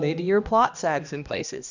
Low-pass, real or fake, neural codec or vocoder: 7.2 kHz; fake; codec, 16 kHz, 1 kbps, X-Codec, HuBERT features, trained on balanced general audio